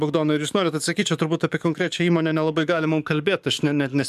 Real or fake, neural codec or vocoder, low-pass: fake; codec, 44.1 kHz, 7.8 kbps, DAC; 14.4 kHz